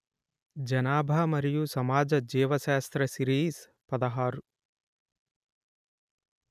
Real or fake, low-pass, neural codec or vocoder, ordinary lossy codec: real; 14.4 kHz; none; none